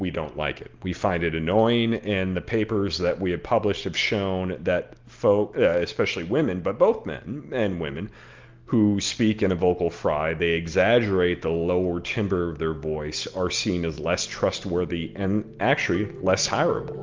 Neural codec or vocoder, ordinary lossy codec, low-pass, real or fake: none; Opus, 32 kbps; 7.2 kHz; real